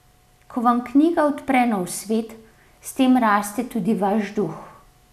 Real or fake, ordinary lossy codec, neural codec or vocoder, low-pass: real; none; none; 14.4 kHz